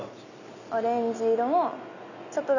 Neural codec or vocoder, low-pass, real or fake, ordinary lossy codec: none; 7.2 kHz; real; none